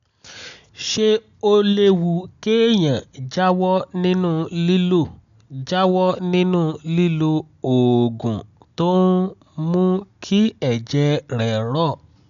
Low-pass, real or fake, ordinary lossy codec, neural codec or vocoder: 7.2 kHz; real; none; none